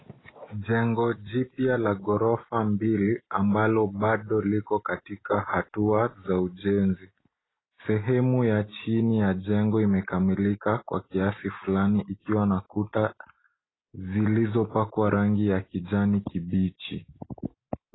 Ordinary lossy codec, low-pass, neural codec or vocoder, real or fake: AAC, 16 kbps; 7.2 kHz; none; real